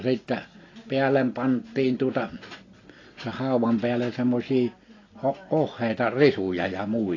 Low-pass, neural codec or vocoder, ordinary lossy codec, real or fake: 7.2 kHz; none; AAC, 32 kbps; real